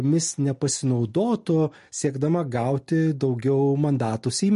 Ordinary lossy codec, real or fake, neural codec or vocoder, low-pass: MP3, 48 kbps; real; none; 14.4 kHz